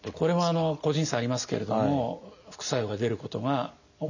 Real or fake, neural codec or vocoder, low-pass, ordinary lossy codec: real; none; 7.2 kHz; none